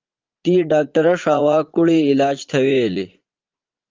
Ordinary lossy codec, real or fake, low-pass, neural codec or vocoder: Opus, 32 kbps; fake; 7.2 kHz; vocoder, 44.1 kHz, 80 mel bands, Vocos